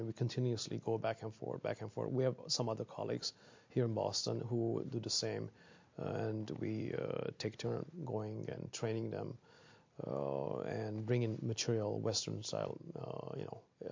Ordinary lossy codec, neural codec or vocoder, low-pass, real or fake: MP3, 48 kbps; none; 7.2 kHz; real